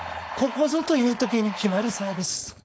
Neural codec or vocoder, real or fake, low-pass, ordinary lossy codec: codec, 16 kHz, 4.8 kbps, FACodec; fake; none; none